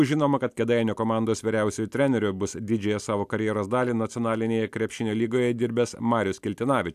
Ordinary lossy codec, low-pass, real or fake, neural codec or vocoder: AAC, 96 kbps; 14.4 kHz; real; none